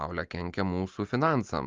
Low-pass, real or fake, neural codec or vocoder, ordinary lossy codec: 7.2 kHz; real; none; Opus, 32 kbps